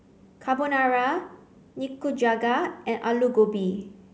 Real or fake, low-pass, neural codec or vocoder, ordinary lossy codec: real; none; none; none